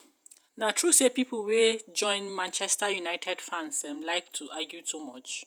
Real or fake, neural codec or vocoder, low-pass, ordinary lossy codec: fake; vocoder, 48 kHz, 128 mel bands, Vocos; none; none